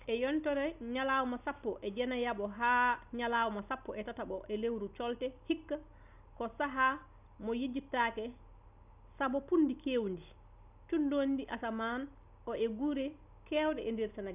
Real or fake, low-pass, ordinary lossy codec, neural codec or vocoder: real; 3.6 kHz; none; none